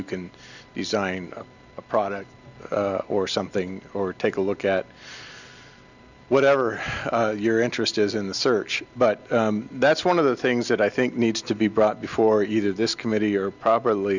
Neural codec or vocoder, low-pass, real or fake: none; 7.2 kHz; real